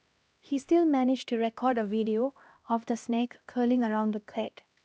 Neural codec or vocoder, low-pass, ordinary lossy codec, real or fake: codec, 16 kHz, 1 kbps, X-Codec, HuBERT features, trained on LibriSpeech; none; none; fake